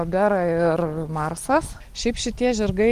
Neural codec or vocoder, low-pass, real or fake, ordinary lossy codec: none; 14.4 kHz; real; Opus, 24 kbps